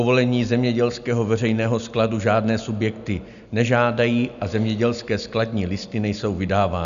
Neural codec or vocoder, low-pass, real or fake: none; 7.2 kHz; real